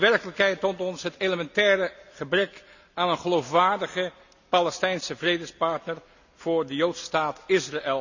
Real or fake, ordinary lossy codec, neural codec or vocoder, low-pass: real; none; none; 7.2 kHz